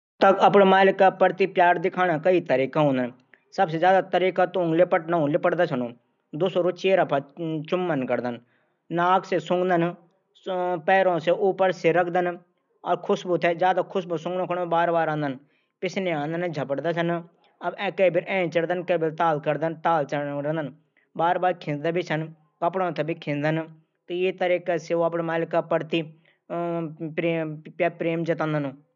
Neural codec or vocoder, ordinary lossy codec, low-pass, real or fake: none; none; 7.2 kHz; real